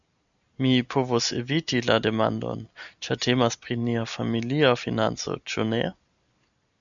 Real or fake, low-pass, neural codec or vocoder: real; 7.2 kHz; none